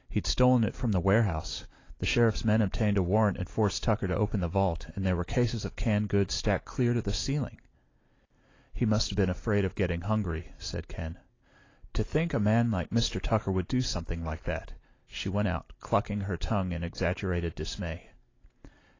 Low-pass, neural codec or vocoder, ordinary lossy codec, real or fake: 7.2 kHz; none; AAC, 32 kbps; real